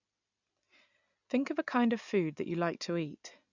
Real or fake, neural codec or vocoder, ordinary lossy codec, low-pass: real; none; none; 7.2 kHz